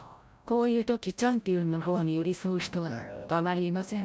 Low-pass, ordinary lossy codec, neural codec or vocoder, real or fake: none; none; codec, 16 kHz, 0.5 kbps, FreqCodec, larger model; fake